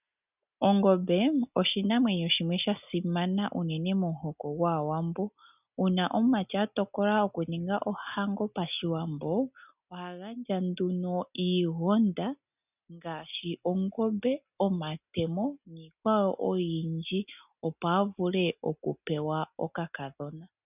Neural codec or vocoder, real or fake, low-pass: none; real; 3.6 kHz